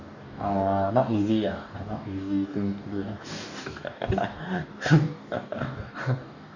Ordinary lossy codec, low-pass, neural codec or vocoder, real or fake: none; 7.2 kHz; codec, 44.1 kHz, 2.6 kbps, DAC; fake